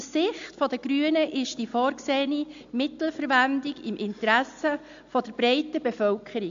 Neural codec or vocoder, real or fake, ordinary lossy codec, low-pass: none; real; AAC, 64 kbps; 7.2 kHz